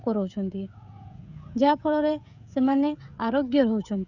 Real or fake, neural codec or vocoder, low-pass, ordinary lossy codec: fake; codec, 16 kHz, 16 kbps, FreqCodec, smaller model; 7.2 kHz; none